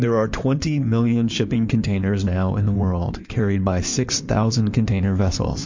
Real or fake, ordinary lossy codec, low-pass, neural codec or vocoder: fake; MP3, 48 kbps; 7.2 kHz; codec, 16 kHz in and 24 kHz out, 2.2 kbps, FireRedTTS-2 codec